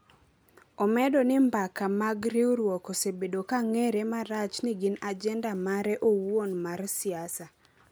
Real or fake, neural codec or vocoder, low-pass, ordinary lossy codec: real; none; none; none